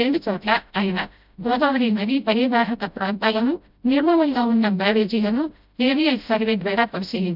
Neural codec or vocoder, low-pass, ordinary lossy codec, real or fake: codec, 16 kHz, 0.5 kbps, FreqCodec, smaller model; 5.4 kHz; none; fake